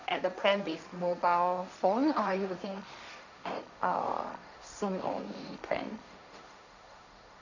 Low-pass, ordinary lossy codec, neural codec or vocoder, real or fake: 7.2 kHz; none; codec, 16 kHz, 1.1 kbps, Voila-Tokenizer; fake